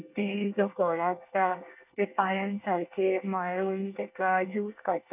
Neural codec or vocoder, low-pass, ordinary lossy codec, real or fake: codec, 24 kHz, 1 kbps, SNAC; 3.6 kHz; none; fake